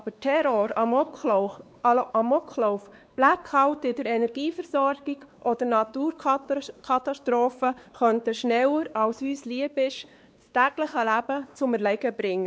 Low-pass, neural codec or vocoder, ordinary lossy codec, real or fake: none; codec, 16 kHz, 2 kbps, X-Codec, WavLM features, trained on Multilingual LibriSpeech; none; fake